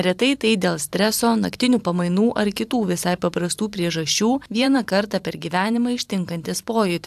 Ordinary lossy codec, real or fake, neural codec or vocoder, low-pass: AAC, 96 kbps; fake; vocoder, 44.1 kHz, 128 mel bands every 512 samples, BigVGAN v2; 14.4 kHz